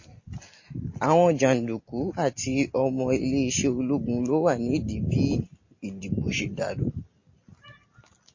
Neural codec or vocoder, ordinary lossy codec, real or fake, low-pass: none; MP3, 32 kbps; real; 7.2 kHz